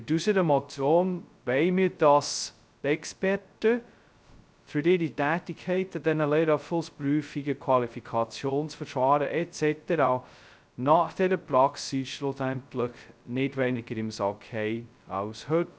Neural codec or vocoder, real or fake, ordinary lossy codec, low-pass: codec, 16 kHz, 0.2 kbps, FocalCodec; fake; none; none